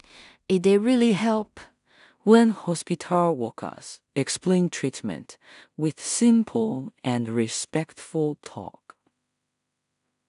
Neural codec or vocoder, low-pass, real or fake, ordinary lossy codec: codec, 16 kHz in and 24 kHz out, 0.4 kbps, LongCat-Audio-Codec, two codebook decoder; 10.8 kHz; fake; none